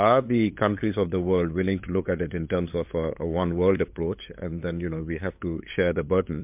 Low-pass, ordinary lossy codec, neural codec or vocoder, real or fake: 3.6 kHz; MP3, 32 kbps; codec, 16 kHz, 8 kbps, FunCodec, trained on LibriTTS, 25 frames a second; fake